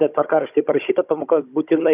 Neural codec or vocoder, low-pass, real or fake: codec, 16 kHz, 16 kbps, FunCodec, trained on Chinese and English, 50 frames a second; 3.6 kHz; fake